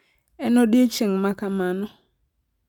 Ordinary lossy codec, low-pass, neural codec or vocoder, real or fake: none; 19.8 kHz; none; real